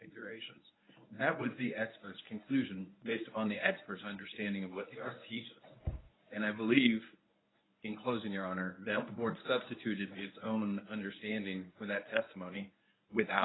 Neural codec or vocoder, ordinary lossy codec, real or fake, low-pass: codec, 24 kHz, 0.9 kbps, WavTokenizer, medium speech release version 1; AAC, 16 kbps; fake; 7.2 kHz